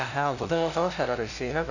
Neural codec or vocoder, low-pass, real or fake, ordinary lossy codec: codec, 16 kHz, 0.5 kbps, FunCodec, trained on LibriTTS, 25 frames a second; 7.2 kHz; fake; none